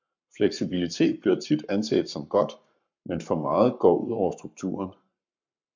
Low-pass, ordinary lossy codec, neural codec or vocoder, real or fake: 7.2 kHz; MP3, 64 kbps; codec, 44.1 kHz, 7.8 kbps, Pupu-Codec; fake